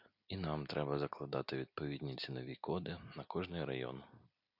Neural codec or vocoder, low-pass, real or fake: none; 5.4 kHz; real